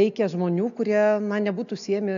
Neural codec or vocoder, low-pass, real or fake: none; 7.2 kHz; real